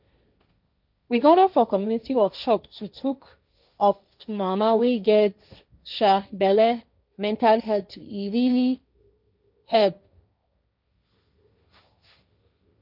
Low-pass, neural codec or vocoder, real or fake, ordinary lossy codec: 5.4 kHz; codec, 16 kHz, 1.1 kbps, Voila-Tokenizer; fake; none